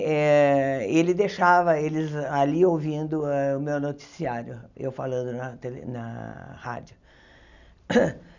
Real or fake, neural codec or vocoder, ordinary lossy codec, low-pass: real; none; none; 7.2 kHz